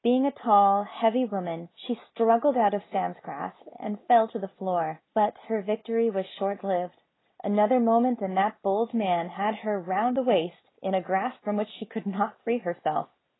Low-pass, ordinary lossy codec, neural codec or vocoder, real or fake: 7.2 kHz; AAC, 16 kbps; none; real